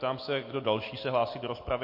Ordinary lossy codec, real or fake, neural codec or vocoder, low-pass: MP3, 32 kbps; real; none; 5.4 kHz